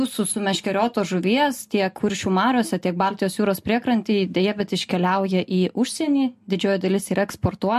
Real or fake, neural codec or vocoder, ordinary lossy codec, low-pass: real; none; MP3, 64 kbps; 14.4 kHz